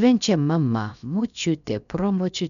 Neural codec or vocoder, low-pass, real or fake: codec, 16 kHz, about 1 kbps, DyCAST, with the encoder's durations; 7.2 kHz; fake